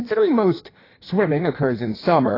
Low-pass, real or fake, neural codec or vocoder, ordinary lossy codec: 5.4 kHz; fake; codec, 16 kHz in and 24 kHz out, 1.1 kbps, FireRedTTS-2 codec; AAC, 24 kbps